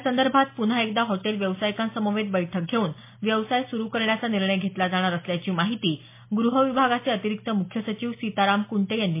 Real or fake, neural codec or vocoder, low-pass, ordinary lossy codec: real; none; 3.6 kHz; MP3, 24 kbps